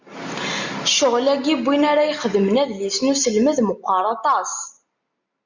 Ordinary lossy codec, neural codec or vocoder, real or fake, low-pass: MP3, 64 kbps; none; real; 7.2 kHz